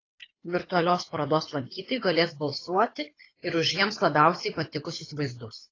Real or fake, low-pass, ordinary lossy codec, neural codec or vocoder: fake; 7.2 kHz; AAC, 32 kbps; codec, 24 kHz, 6 kbps, HILCodec